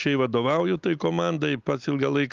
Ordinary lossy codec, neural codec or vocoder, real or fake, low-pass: Opus, 32 kbps; none; real; 7.2 kHz